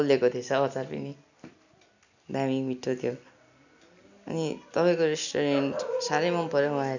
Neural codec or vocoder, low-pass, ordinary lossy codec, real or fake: none; 7.2 kHz; none; real